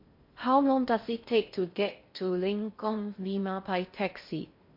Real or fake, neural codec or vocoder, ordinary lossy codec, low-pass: fake; codec, 16 kHz in and 24 kHz out, 0.6 kbps, FocalCodec, streaming, 2048 codes; MP3, 32 kbps; 5.4 kHz